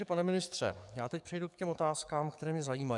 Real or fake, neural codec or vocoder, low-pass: fake; codec, 44.1 kHz, 7.8 kbps, DAC; 10.8 kHz